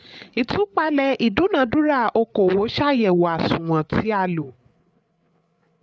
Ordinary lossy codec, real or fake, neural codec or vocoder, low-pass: none; fake; codec, 16 kHz, 8 kbps, FreqCodec, larger model; none